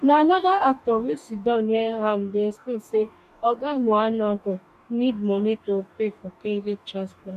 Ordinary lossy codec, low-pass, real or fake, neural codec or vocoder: none; 14.4 kHz; fake; codec, 44.1 kHz, 2.6 kbps, DAC